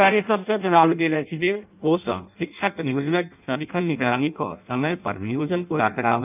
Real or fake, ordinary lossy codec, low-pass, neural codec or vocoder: fake; none; 3.6 kHz; codec, 16 kHz in and 24 kHz out, 0.6 kbps, FireRedTTS-2 codec